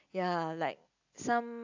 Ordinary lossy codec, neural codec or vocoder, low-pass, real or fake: AAC, 48 kbps; none; 7.2 kHz; real